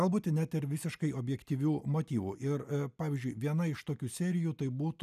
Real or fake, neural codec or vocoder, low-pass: real; none; 14.4 kHz